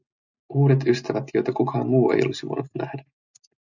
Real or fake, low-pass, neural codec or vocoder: real; 7.2 kHz; none